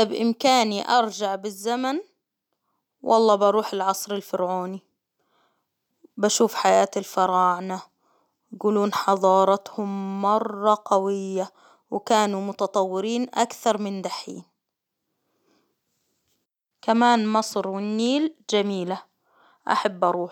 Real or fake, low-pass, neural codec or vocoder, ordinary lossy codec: real; 19.8 kHz; none; none